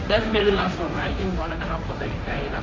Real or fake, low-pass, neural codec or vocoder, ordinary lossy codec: fake; none; codec, 16 kHz, 1.1 kbps, Voila-Tokenizer; none